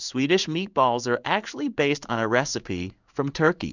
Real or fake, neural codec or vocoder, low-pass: fake; codec, 16 kHz in and 24 kHz out, 1 kbps, XY-Tokenizer; 7.2 kHz